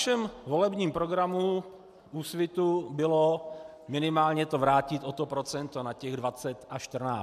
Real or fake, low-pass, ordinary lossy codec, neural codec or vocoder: real; 14.4 kHz; MP3, 96 kbps; none